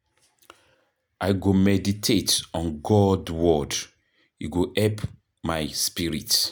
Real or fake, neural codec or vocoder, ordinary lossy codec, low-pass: real; none; none; none